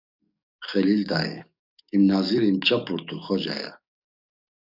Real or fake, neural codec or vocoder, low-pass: fake; codec, 44.1 kHz, 7.8 kbps, DAC; 5.4 kHz